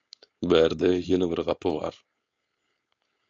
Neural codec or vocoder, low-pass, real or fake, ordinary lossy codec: codec, 16 kHz, 4.8 kbps, FACodec; 7.2 kHz; fake; AAC, 48 kbps